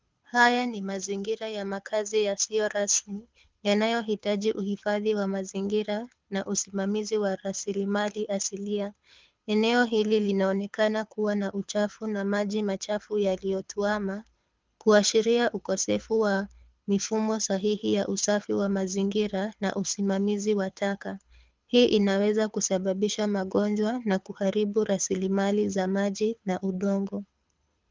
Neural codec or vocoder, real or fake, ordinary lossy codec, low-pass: codec, 24 kHz, 6 kbps, HILCodec; fake; Opus, 24 kbps; 7.2 kHz